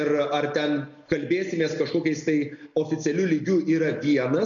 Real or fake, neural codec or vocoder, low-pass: real; none; 7.2 kHz